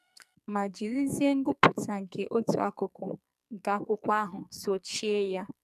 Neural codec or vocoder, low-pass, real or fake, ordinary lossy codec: codec, 44.1 kHz, 2.6 kbps, SNAC; 14.4 kHz; fake; none